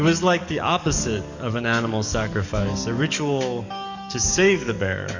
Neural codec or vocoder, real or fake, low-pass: codec, 44.1 kHz, 7.8 kbps, DAC; fake; 7.2 kHz